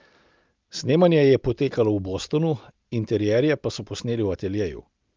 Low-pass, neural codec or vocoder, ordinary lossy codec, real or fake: 7.2 kHz; none; Opus, 24 kbps; real